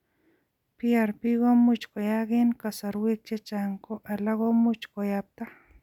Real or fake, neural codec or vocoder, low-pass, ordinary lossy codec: real; none; 19.8 kHz; none